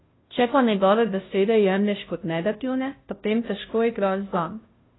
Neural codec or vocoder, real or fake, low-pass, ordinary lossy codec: codec, 16 kHz, 0.5 kbps, FunCodec, trained on Chinese and English, 25 frames a second; fake; 7.2 kHz; AAC, 16 kbps